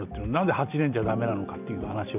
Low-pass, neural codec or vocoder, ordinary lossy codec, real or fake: 3.6 kHz; none; none; real